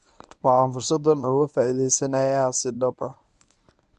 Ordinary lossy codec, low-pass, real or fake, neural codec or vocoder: none; 10.8 kHz; fake; codec, 24 kHz, 0.9 kbps, WavTokenizer, medium speech release version 1